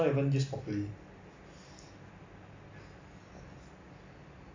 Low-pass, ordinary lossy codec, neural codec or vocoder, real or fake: 7.2 kHz; MP3, 48 kbps; none; real